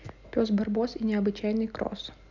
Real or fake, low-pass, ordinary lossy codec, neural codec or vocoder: real; 7.2 kHz; none; none